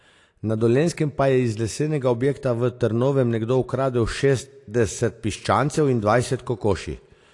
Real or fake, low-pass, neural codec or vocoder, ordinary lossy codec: real; 10.8 kHz; none; AAC, 48 kbps